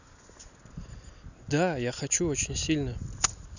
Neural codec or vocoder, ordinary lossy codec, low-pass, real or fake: none; none; 7.2 kHz; real